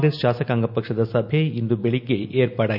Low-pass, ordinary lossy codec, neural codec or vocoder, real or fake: 5.4 kHz; none; none; real